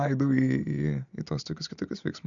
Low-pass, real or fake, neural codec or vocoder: 7.2 kHz; real; none